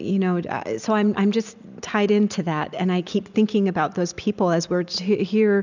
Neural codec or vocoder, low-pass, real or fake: none; 7.2 kHz; real